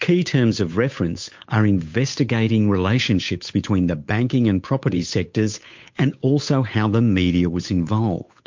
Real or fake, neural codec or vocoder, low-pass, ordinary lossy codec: fake; codec, 16 kHz, 8 kbps, FunCodec, trained on Chinese and English, 25 frames a second; 7.2 kHz; MP3, 48 kbps